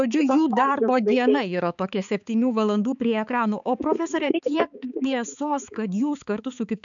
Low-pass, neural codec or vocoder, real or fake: 7.2 kHz; codec, 16 kHz, 4 kbps, X-Codec, HuBERT features, trained on balanced general audio; fake